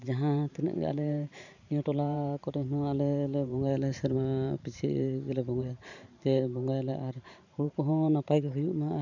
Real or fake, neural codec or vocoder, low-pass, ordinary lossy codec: fake; vocoder, 44.1 kHz, 128 mel bands every 512 samples, BigVGAN v2; 7.2 kHz; none